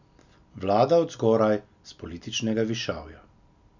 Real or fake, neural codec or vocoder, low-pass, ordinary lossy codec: real; none; 7.2 kHz; none